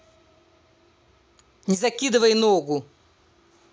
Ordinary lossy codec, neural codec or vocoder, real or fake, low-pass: none; none; real; none